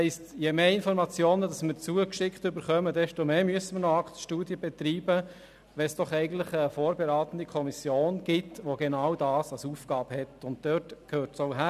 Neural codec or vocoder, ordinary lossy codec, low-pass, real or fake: none; none; 14.4 kHz; real